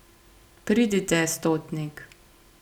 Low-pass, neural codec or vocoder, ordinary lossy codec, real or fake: 19.8 kHz; none; none; real